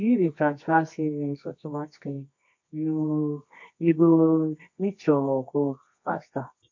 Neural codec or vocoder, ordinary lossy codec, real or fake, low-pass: codec, 24 kHz, 0.9 kbps, WavTokenizer, medium music audio release; MP3, 48 kbps; fake; 7.2 kHz